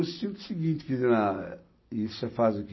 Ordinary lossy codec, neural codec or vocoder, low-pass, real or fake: MP3, 24 kbps; none; 7.2 kHz; real